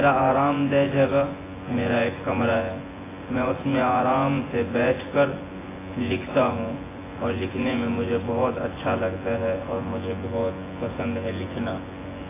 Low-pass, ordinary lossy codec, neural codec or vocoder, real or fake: 3.6 kHz; AAC, 16 kbps; vocoder, 24 kHz, 100 mel bands, Vocos; fake